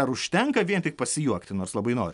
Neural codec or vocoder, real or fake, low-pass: none; real; 10.8 kHz